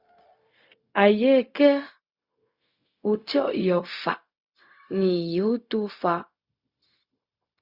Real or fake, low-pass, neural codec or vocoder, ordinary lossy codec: fake; 5.4 kHz; codec, 16 kHz, 0.4 kbps, LongCat-Audio-Codec; Opus, 64 kbps